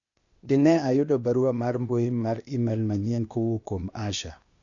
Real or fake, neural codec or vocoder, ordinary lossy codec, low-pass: fake; codec, 16 kHz, 0.8 kbps, ZipCodec; none; 7.2 kHz